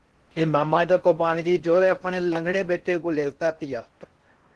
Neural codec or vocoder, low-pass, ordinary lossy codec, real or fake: codec, 16 kHz in and 24 kHz out, 0.6 kbps, FocalCodec, streaming, 4096 codes; 10.8 kHz; Opus, 16 kbps; fake